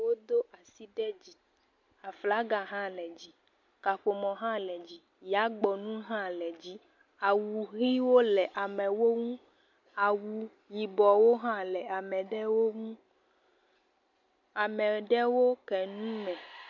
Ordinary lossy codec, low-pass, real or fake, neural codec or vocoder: MP3, 48 kbps; 7.2 kHz; real; none